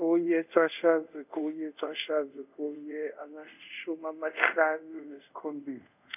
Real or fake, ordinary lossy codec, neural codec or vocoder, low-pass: fake; none; codec, 24 kHz, 0.5 kbps, DualCodec; 3.6 kHz